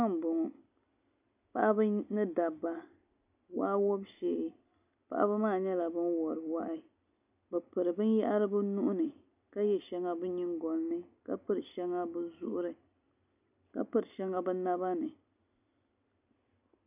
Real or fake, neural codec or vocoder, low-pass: real; none; 3.6 kHz